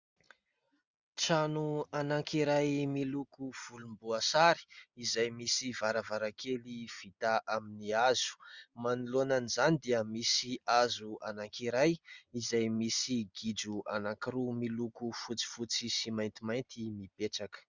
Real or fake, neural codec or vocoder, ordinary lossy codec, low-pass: real; none; Opus, 64 kbps; 7.2 kHz